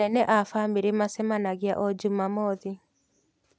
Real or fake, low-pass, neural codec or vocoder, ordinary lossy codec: real; none; none; none